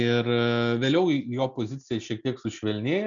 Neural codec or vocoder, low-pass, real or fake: none; 7.2 kHz; real